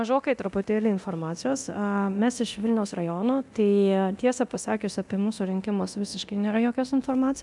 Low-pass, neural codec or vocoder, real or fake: 10.8 kHz; codec, 24 kHz, 0.9 kbps, DualCodec; fake